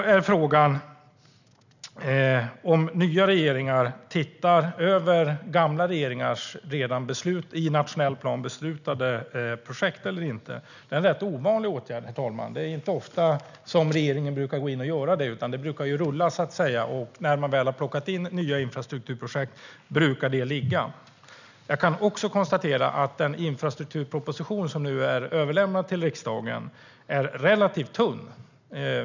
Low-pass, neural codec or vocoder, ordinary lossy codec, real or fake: 7.2 kHz; none; none; real